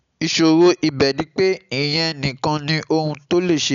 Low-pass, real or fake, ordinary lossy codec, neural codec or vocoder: 7.2 kHz; real; none; none